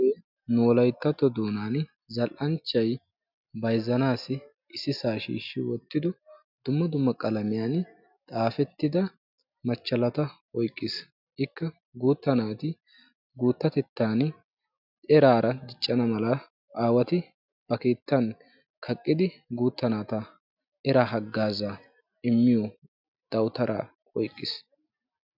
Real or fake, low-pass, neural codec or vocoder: real; 5.4 kHz; none